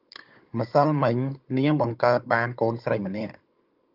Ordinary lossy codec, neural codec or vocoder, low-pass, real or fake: Opus, 24 kbps; codec, 16 kHz, 16 kbps, FunCodec, trained on Chinese and English, 50 frames a second; 5.4 kHz; fake